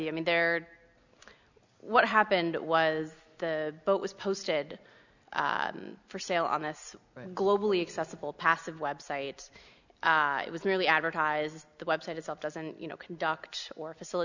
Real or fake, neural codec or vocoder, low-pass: real; none; 7.2 kHz